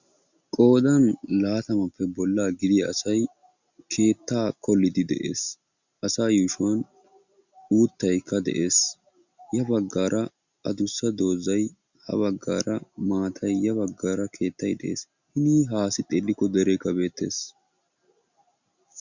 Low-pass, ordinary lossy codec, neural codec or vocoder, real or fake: 7.2 kHz; Opus, 64 kbps; none; real